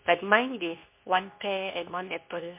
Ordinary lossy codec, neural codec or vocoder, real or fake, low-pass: MP3, 32 kbps; codec, 24 kHz, 0.9 kbps, WavTokenizer, medium speech release version 2; fake; 3.6 kHz